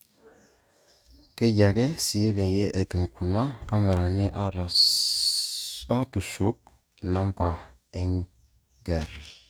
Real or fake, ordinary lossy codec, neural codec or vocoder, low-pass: fake; none; codec, 44.1 kHz, 2.6 kbps, DAC; none